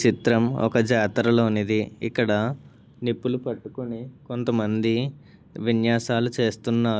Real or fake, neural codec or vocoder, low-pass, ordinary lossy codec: real; none; none; none